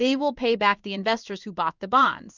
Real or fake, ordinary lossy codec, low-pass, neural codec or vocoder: fake; Opus, 64 kbps; 7.2 kHz; codec, 16 kHz in and 24 kHz out, 1 kbps, XY-Tokenizer